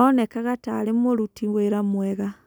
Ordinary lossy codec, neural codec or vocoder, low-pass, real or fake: none; none; none; real